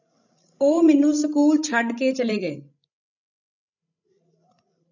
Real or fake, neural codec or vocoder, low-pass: fake; codec, 16 kHz, 16 kbps, FreqCodec, larger model; 7.2 kHz